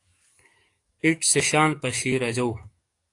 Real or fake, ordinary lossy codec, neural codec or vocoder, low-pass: fake; AAC, 48 kbps; codec, 44.1 kHz, 7.8 kbps, DAC; 10.8 kHz